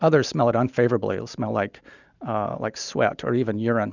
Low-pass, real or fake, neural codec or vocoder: 7.2 kHz; real; none